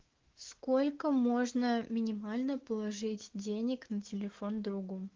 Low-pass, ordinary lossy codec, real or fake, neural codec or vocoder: 7.2 kHz; Opus, 16 kbps; fake; autoencoder, 48 kHz, 128 numbers a frame, DAC-VAE, trained on Japanese speech